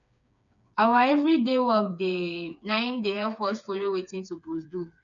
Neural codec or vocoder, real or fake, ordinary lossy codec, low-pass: codec, 16 kHz, 4 kbps, FreqCodec, smaller model; fake; none; 7.2 kHz